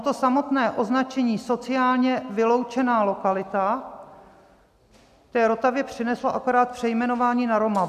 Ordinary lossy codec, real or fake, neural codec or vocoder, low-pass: MP3, 96 kbps; real; none; 14.4 kHz